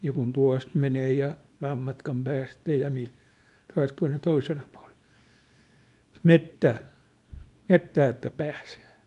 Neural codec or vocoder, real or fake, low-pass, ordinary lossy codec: codec, 24 kHz, 0.9 kbps, WavTokenizer, small release; fake; 10.8 kHz; none